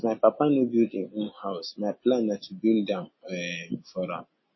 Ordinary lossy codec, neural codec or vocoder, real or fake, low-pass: MP3, 24 kbps; none; real; 7.2 kHz